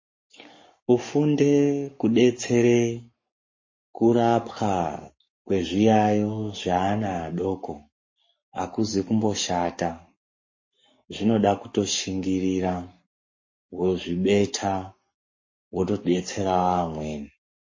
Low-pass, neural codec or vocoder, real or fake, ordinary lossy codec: 7.2 kHz; codec, 44.1 kHz, 7.8 kbps, Pupu-Codec; fake; MP3, 32 kbps